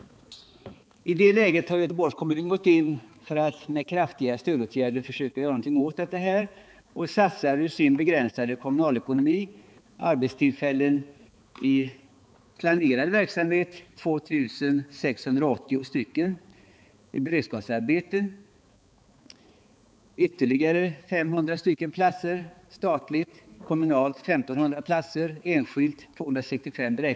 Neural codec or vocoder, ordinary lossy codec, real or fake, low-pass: codec, 16 kHz, 4 kbps, X-Codec, HuBERT features, trained on balanced general audio; none; fake; none